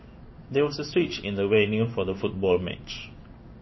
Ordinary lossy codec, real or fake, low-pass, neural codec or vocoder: MP3, 24 kbps; fake; 7.2 kHz; codec, 16 kHz in and 24 kHz out, 1 kbps, XY-Tokenizer